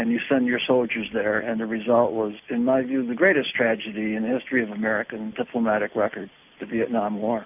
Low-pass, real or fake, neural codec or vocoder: 3.6 kHz; real; none